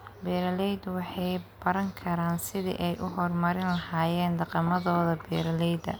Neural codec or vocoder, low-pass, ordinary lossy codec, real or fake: none; none; none; real